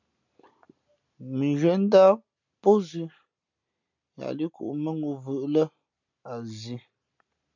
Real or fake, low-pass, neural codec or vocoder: real; 7.2 kHz; none